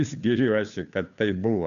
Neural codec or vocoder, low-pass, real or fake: codec, 16 kHz, 2 kbps, FunCodec, trained on Chinese and English, 25 frames a second; 7.2 kHz; fake